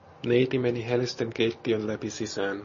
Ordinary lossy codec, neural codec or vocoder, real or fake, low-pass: MP3, 32 kbps; none; real; 7.2 kHz